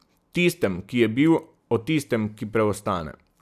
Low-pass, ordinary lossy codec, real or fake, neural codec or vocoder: 14.4 kHz; none; real; none